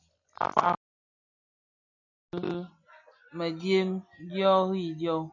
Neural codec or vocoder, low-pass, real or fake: none; 7.2 kHz; real